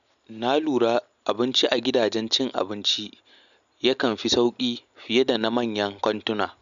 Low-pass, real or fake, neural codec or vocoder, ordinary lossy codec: 7.2 kHz; real; none; none